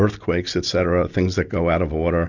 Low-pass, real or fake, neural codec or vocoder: 7.2 kHz; real; none